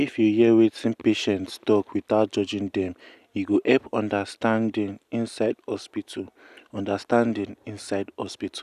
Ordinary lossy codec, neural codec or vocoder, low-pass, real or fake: none; none; 14.4 kHz; real